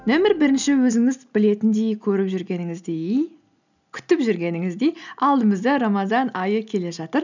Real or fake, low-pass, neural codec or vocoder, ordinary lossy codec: real; 7.2 kHz; none; none